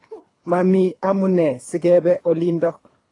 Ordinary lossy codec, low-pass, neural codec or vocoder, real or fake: AAC, 32 kbps; 10.8 kHz; codec, 24 kHz, 3 kbps, HILCodec; fake